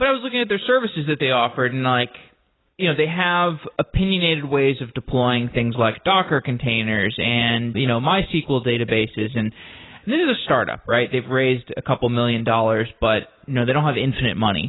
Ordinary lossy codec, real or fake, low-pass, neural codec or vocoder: AAC, 16 kbps; real; 7.2 kHz; none